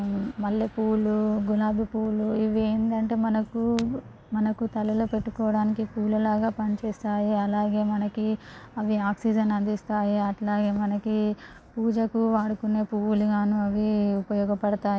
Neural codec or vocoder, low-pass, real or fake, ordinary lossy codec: none; none; real; none